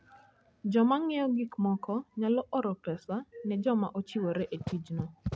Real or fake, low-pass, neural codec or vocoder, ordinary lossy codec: real; none; none; none